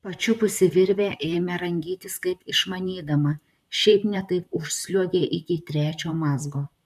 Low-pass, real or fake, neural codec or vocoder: 14.4 kHz; fake; vocoder, 44.1 kHz, 128 mel bands, Pupu-Vocoder